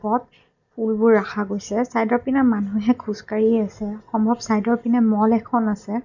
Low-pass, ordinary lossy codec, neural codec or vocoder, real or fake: 7.2 kHz; none; none; real